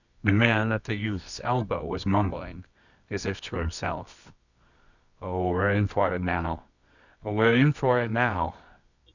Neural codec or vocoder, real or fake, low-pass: codec, 24 kHz, 0.9 kbps, WavTokenizer, medium music audio release; fake; 7.2 kHz